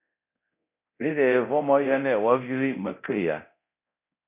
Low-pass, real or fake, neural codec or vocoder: 3.6 kHz; fake; codec, 24 kHz, 0.9 kbps, DualCodec